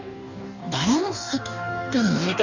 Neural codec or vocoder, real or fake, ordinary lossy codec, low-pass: codec, 44.1 kHz, 2.6 kbps, DAC; fake; none; 7.2 kHz